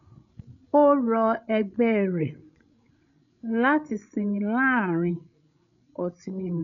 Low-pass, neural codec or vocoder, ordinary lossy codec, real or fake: 7.2 kHz; codec, 16 kHz, 16 kbps, FreqCodec, larger model; none; fake